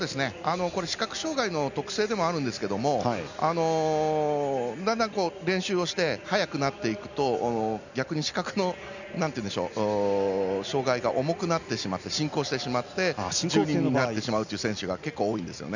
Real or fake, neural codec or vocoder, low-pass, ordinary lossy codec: real; none; 7.2 kHz; none